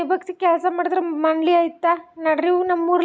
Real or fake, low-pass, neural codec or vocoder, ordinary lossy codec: real; none; none; none